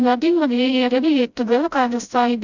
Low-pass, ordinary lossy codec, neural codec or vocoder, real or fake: 7.2 kHz; none; codec, 16 kHz, 0.5 kbps, FreqCodec, smaller model; fake